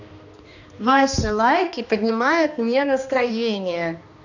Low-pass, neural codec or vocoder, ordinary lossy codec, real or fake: 7.2 kHz; codec, 16 kHz, 2 kbps, X-Codec, HuBERT features, trained on balanced general audio; none; fake